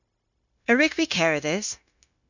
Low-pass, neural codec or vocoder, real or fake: 7.2 kHz; codec, 16 kHz, 0.9 kbps, LongCat-Audio-Codec; fake